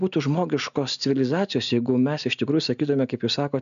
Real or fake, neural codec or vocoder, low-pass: real; none; 7.2 kHz